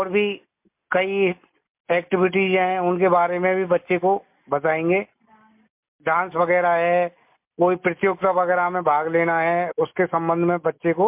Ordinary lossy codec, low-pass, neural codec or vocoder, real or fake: MP3, 32 kbps; 3.6 kHz; none; real